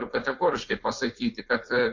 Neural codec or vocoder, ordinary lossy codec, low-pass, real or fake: codec, 16 kHz, 4.8 kbps, FACodec; MP3, 48 kbps; 7.2 kHz; fake